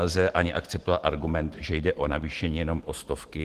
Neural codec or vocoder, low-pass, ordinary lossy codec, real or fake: autoencoder, 48 kHz, 128 numbers a frame, DAC-VAE, trained on Japanese speech; 14.4 kHz; Opus, 16 kbps; fake